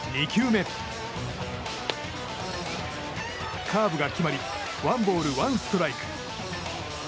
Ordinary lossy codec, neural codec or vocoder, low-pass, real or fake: none; none; none; real